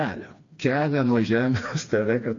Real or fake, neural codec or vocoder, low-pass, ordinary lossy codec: fake; codec, 16 kHz, 2 kbps, FreqCodec, smaller model; 7.2 kHz; AAC, 48 kbps